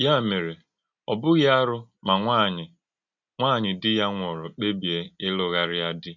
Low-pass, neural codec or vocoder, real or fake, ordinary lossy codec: 7.2 kHz; none; real; none